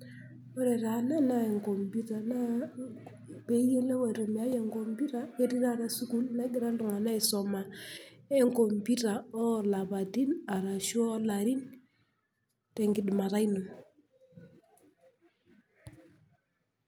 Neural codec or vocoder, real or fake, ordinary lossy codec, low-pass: none; real; none; none